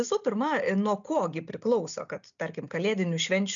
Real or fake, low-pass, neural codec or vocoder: real; 7.2 kHz; none